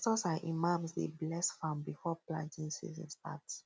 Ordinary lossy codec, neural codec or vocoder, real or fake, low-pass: none; none; real; none